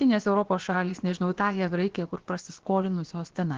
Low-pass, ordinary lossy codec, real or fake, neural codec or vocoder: 7.2 kHz; Opus, 16 kbps; fake; codec, 16 kHz, about 1 kbps, DyCAST, with the encoder's durations